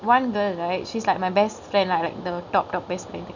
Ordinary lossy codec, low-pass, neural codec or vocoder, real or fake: none; 7.2 kHz; none; real